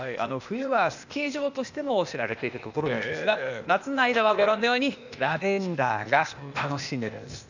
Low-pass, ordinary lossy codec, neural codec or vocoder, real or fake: 7.2 kHz; none; codec, 16 kHz, 0.8 kbps, ZipCodec; fake